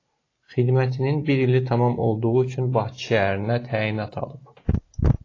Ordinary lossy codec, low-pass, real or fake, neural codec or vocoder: AAC, 32 kbps; 7.2 kHz; real; none